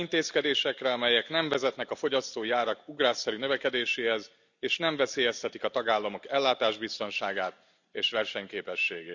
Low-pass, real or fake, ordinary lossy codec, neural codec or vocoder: 7.2 kHz; real; none; none